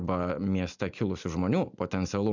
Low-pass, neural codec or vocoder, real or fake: 7.2 kHz; none; real